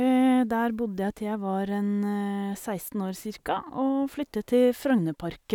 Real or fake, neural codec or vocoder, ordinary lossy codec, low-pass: real; none; none; 19.8 kHz